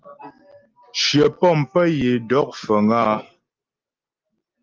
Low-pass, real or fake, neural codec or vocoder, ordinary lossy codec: 7.2 kHz; real; none; Opus, 32 kbps